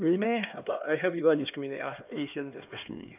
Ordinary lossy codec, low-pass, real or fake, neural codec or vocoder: none; 3.6 kHz; fake; codec, 16 kHz, 2 kbps, X-Codec, HuBERT features, trained on LibriSpeech